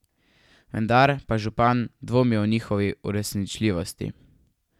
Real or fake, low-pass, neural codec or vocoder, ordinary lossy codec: real; 19.8 kHz; none; none